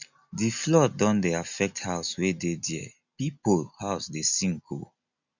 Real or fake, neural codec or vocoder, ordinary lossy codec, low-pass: real; none; none; 7.2 kHz